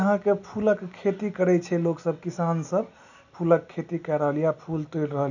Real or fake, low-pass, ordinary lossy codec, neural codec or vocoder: real; 7.2 kHz; none; none